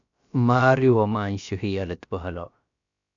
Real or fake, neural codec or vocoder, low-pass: fake; codec, 16 kHz, about 1 kbps, DyCAST, with the encoder's durations; 7.2 kHz